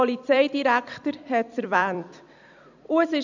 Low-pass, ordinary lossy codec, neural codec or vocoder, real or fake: 7.2 kHz; none; vocoder, 44.1 kHz, 128 mel bands every 256 samples, BigVGAN v2; fake